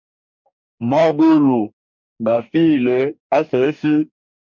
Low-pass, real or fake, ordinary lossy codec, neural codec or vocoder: 7.2 kHz; fake; MP3, 64 kbps; codec, 44.1 kHz, 2.6 kbps, DAC